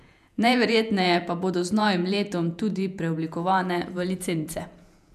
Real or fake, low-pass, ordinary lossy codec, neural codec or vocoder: fake; 14.4 kHz; none; vocoder, 48 kHz, 128 mel bands, Vocos